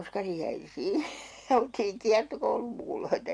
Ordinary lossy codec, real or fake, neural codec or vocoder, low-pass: MP3, 64 kbps; real; none; 9.9 kHz